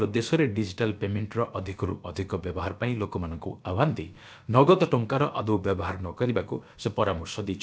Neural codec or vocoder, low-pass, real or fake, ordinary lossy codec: codec, 16 kHz, about 1 kbps, DyCAST, with the encoder's durations; none; fake; none